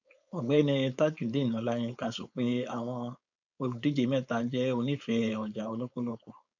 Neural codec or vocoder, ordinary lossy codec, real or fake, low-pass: codec, 16 kHz, 4.8 kbps, FACodec; none; fake; 7.2 kHz